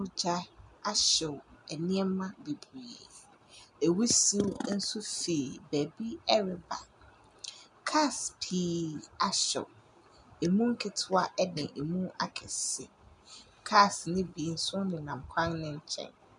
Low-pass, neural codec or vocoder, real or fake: 10.8 kHz; none; real